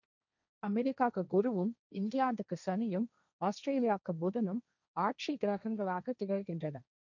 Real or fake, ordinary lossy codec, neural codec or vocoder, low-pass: fake; none; codec, 16 kHz, 1.1 kbps, Voila-Tokenizer; 7.2 kHz